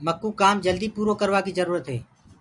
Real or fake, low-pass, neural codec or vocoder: real; 10.8 kHz; none